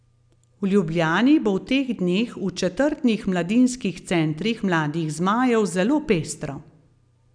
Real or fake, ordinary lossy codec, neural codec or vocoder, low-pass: real; none; none; 9.9 kHz